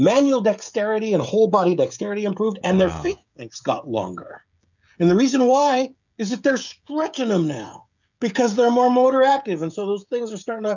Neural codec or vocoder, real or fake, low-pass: codec, 16 kHz, 16 kbps, FreqCodec, smaller model; fake; 7.2 kHz